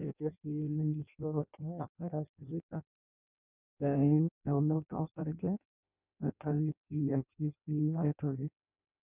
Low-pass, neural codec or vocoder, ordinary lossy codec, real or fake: 3.6 kHz; codec, 16 kHz in and 24 kHz out, 0.6 kbps, FireRedTTS-2 codec; none; fake